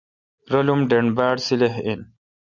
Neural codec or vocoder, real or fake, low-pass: none; real; 7.2 kHz